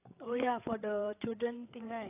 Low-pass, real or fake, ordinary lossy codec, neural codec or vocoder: 3.6 kHz; fake; AAC, 24 kbps; codec, 16 kHz, 8 kbps, FreqCodec, larger model